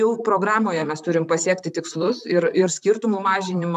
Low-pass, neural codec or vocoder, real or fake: 14.4 kHz; vocoder, 44.1 kHz, 128 mel bands, Pupu-Vocoder; fake